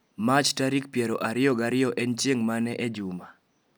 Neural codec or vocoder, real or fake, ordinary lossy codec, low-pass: none; real; none; none